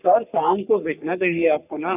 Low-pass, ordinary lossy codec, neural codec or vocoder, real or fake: 3.6 kHz; none; codec, 44.1 kHz, 3.4 kbps, Pupu-Codec; fake